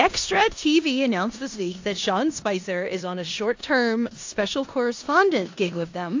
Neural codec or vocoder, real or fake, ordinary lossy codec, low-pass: codec, 16 kHz in and 24 kHz out, 0.9 kbps, LongCat-Audio-Codec, four codebook decoder; fake; AAC, 48 kbps; 7.2 kHz